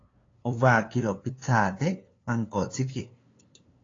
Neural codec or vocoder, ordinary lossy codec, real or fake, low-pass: codec, 16 kHz, 2 kbps, FunCodec, trained on LibriTTS, 25 frames a second; AAC, 32 kbps; fake; 7.2 kHz